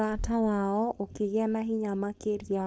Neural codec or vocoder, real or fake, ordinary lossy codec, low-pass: codec, 16 kHz, 4.8 kbps, FACodec; fake; none; none